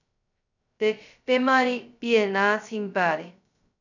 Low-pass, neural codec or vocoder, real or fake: 7.2 kHz; codec, 16 kHz, 0.2 kbps, FocalCodec; fake